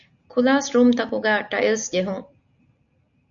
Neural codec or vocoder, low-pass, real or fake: none; 7.2 kHz; real